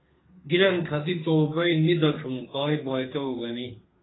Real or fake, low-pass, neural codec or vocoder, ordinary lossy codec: fake; 7.2 kHz; codec, 32 kHz, 1.9 kbps, SNAC; AAC, 16 kbps